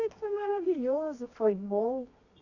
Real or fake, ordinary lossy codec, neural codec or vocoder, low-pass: fake; none; codec, 24 kHz, 0.9 kbps, WavTokenizer, medium music audio release; 7.2 kHz